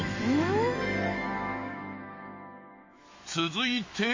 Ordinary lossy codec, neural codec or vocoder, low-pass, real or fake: MP3, 32 kbps; autoencoder, 48 kHz, 128 numbers a frame, DAC-VAE, trained on Japanese speech; 7.2 kHz; fake